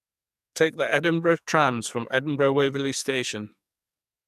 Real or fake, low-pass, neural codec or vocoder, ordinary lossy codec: fake; 14.4 kHz; codec, 44.1 kHz, 2.6 kbps, SNAC; none